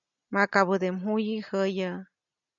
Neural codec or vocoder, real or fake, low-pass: none; real; 7.2 kHz